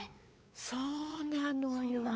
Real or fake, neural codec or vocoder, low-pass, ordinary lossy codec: fake; codec, 16 kHz, 4 kbps, X-Codec, WavLM features, trained on Multilingual LibriSpeech; none; none